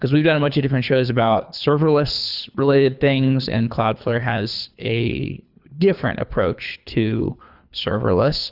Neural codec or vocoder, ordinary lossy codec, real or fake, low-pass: codec, 24 kHz, 3 kbps, HILCodec; Opus, 64 kbps; fake; 5.4 kHz